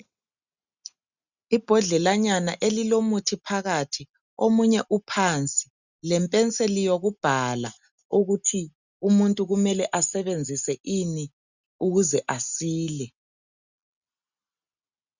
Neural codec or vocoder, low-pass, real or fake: none; 7.2 kHz; real